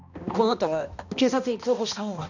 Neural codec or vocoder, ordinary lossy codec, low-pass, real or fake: codec, 16 kHz, 1 kbps, X-Codec, HuBERT features, trained on balanced general audio; none; 7.2 kHz; fake